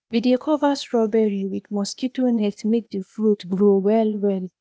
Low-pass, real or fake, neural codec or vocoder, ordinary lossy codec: none; fake; codec, 16 kHz, 0.8 kbps, ZipCodec; none